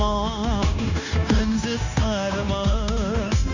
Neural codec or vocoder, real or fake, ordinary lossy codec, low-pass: codec, 16 kHz, 0.9 kbps, LongCat-Audio-Codec; fake; none; 7.2 kHz